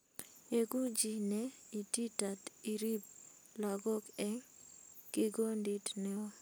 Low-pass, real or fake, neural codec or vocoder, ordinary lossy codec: none; real; none; none